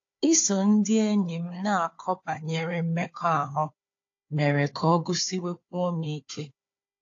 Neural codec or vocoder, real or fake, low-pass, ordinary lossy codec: codec, 16 kHz, 4 kbps, FunCodec, trained on Chinese and English, 50 frames a second; fake; 7.2 kHz; AAC, 48 kbps